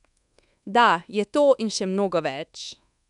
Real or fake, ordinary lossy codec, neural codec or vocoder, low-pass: fake; none; codec, 24 kHz, 1.2 kbps, DualCodec; 10.8 kHz